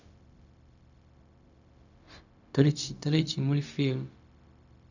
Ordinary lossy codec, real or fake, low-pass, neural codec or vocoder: none; fake; 7.2 kHz; codec, 16 kHz, 0.4 kbps, LongCat-Audio-Codec